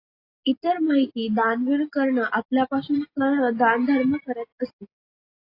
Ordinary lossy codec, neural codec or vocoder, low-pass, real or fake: AAC, 32 kbps; none; 5.4 kHz; real